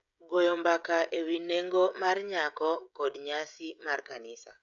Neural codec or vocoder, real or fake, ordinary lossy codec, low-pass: codec, 16 kHz, 16 kbps, FreqCodec, smaller model; fake; none; 7.2 kHz